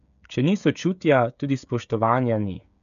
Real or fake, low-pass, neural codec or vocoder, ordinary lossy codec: fake; 7.2 kHz; codec, 16 kHz, 16 kbps, FreqCodec, smaller model; none